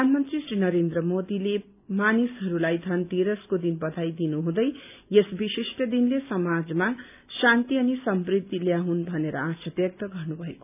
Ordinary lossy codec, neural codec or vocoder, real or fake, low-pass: none; none; real; 3.6 kHz